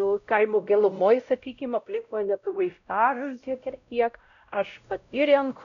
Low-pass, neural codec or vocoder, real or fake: 7.2 kHz; codec, 16 kHz, 0.5 kbps, X-Codec, WavLM features, trained on Multilingual LibriSpeech; fake